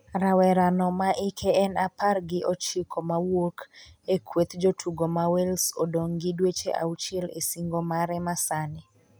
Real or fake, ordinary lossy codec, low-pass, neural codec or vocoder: real; none; none; none